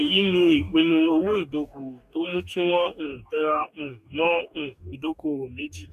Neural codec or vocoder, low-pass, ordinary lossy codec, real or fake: codec, 44.1 kHz, 2.6 kbps, DAC; 14.4 kHz; none; fake